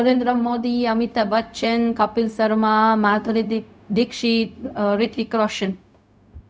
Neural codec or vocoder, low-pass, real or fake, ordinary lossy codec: codec, 16 kHz, 0.4 kbps, LongCat-Audio-Codec; none; fake; none